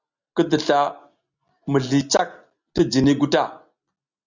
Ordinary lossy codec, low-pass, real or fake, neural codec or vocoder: Opus, 64 kbps; 7.2 kHz; real; none